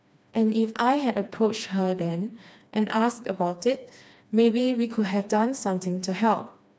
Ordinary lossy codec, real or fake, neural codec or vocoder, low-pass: none; fake; codec, 16 kHz, 2 kbps, FreqCodec, smaller model; none